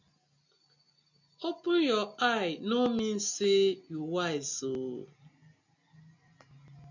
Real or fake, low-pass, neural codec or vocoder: real; 7.2 kHz; none